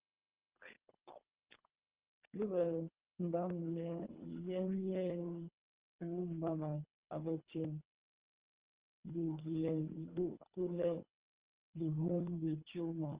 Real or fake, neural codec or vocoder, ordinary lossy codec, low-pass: fake; codec, 24 kHz, 3 kbps, HILCodec; Opus, 24 kbps; 3.6 kHz